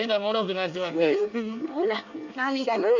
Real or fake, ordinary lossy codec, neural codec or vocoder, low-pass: fake; none; codec, 24 kHz, 1 kbps, SNAC; 7.2 kHz